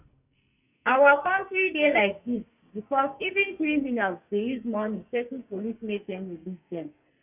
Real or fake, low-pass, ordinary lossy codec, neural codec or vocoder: fake; 3.6 kHz; none; codec, 44.1 kHz, 2.6 kbps, SNAC